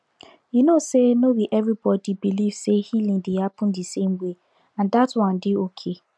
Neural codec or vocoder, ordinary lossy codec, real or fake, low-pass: none; none; real; none